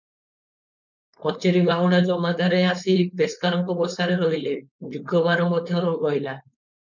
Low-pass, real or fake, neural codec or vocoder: 7.2 kHz; fake; codec, 16 kHz, 4.8 kbps, FACodec